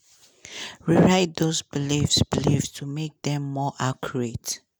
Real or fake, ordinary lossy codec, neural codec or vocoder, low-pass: real; none; none; none